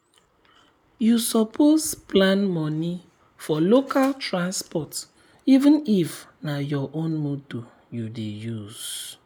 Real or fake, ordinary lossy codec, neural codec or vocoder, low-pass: real; none; none; none